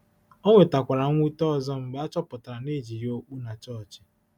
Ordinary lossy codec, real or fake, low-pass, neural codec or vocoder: none; real; 19.8 kHz; none